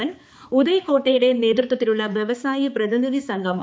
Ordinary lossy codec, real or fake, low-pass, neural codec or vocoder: none; fake; none; codec, 16 kHz, 4 kbps, X-Codec, HuBERT features, trained on balanced general audio